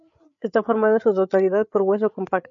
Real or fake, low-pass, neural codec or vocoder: fake; 7.2 kHz; codec, 16 kHz, 8 kbps, FreqCodec, larger model